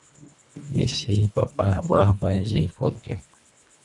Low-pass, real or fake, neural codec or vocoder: 10.8 kHz; fake; codec, 24 kHz, 1.5 kbps, HILCodec